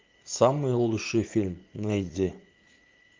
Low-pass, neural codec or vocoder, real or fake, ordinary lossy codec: 7.2 kHz; vocoder, 44.1 kHz, 80 mel bands, Vocos; fake; Opus, 24 kbps